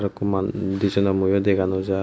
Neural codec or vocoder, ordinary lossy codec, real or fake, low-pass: none; none; real; none